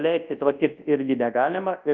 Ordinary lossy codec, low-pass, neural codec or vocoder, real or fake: Opus, 32 kbps; 7.2 kHz; codec, 24 kHz, 0.9 kbps, WavTokenizer, large speech release; fake